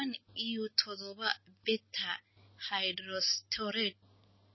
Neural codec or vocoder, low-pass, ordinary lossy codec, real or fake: none; 7.2 kHz; MP3, 24 kbps; real